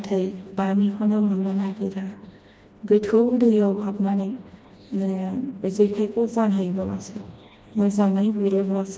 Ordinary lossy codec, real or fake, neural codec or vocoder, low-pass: none; fake; codec, 16 kHz, 1 kbps, FreqCodec, smaller model; none